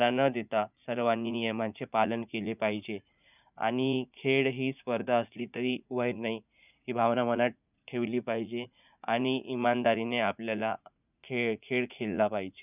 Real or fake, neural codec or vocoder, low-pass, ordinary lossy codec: fake; vocoder, 44.1 kHz, 80 mel bands, Vocos; 3.6 kHz; none